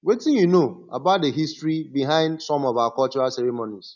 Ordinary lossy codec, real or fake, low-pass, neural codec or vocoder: none; real; 7.2 kHz; none